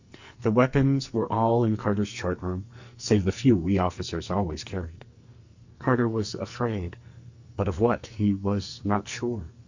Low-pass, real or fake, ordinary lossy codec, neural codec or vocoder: 7.2 kHz; fake; Opus, 64 kbps; codec, 44.1 kHz, 2.6 kbps, SNAC